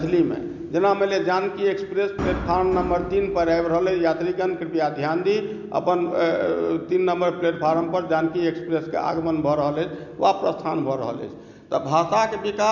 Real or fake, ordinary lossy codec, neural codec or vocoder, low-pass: real; none; none; 7.2 kHz